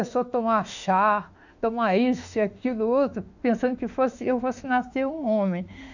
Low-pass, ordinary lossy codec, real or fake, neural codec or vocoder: 7.2 kHz; none; fake; autoencoder, 48 kHz, 32 numbers a frame, DAC-VAE, trained on Japanese speech